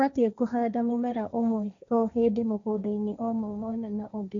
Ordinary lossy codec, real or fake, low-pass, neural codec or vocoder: none; fake; 7.2 kHz; codec, 16 kHz, 1.1 kbps, Voila-Tokenizer